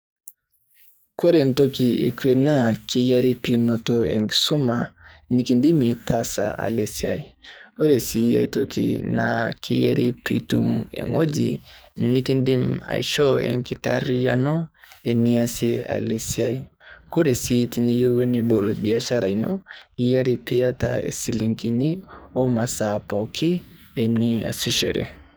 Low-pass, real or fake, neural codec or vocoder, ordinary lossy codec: none; fake; codec, 44.1 kHz, 2.6 kbps, SNAC; none